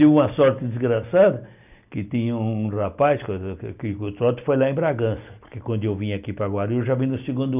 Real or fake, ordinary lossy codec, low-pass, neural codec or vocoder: real; none; 3.6 kHz; none